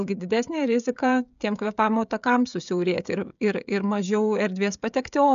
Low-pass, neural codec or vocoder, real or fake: 7.2 kHz; codec, 16 kHz, 16 kbps, FreqCodec, smaller model; fake